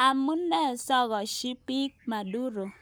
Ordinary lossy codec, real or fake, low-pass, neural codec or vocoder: none; fake; none; codec, 44.1 kHz, 7.8 kbps, Pupu-Codec